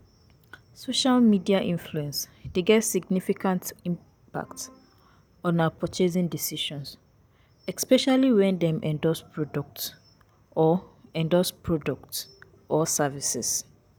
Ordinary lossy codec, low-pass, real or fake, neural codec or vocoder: none; none; real; none